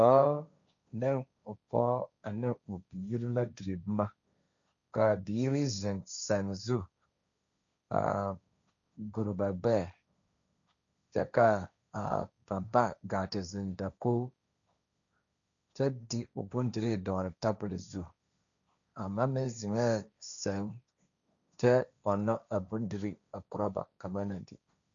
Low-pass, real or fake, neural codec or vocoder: 7.2 kHz; fake; codec, 16 kHz, 1.1 kbps, Voila-Tokenizer